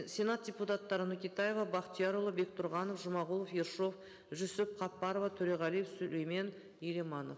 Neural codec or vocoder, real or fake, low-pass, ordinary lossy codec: none; real; none; none